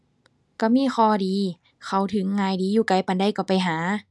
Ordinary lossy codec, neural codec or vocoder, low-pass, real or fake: none; none; none; real